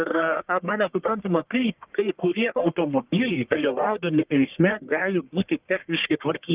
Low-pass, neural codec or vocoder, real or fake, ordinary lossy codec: 3.6 kHz; codec, 44.1 kHz, 1.7 kbps, Pupu-Codec; fake; Opus, 32 kbps